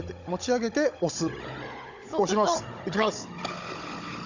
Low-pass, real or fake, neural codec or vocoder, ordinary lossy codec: 7.2 kHz; fake; codec, 16 kHz, 16 kbps, FunCodec, trained on Chinese and English, 50 frames a second; none